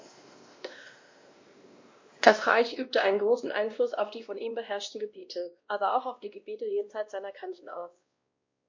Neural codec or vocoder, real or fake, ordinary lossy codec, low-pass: codec, 16 kHz, 1 kbps, X-Codec, WavLM features, trained on Multilingual LibriSpeech; fake; MP3, 48 kbps; 7.2 kHz